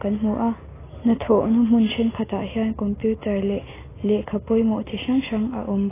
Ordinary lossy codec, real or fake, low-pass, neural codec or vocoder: AAC, 16 kbps; real; 3.6 kHz; none